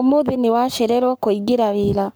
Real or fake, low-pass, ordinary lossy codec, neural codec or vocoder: fake; none; none; codec, 44.1 kHz, 7.8 kbps, Pupu-Codec